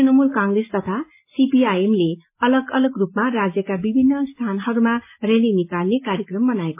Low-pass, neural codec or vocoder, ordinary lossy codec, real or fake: 3.6 kHz; none; MP3, 24 kbps; real